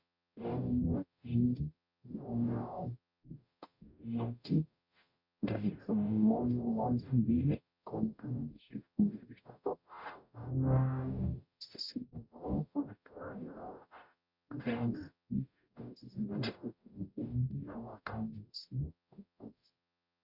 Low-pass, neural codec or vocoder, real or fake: 5.4 kHz; codec, 44.1 kHz, 0.9 kbps, DAC; fake